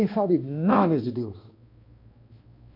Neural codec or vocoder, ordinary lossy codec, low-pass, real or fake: codec, 16 kHz, 1 kbps, X-Codec, HuBERT features, trained on balanced general audio; MP3, 32 kbps; 5.4 kHz; fake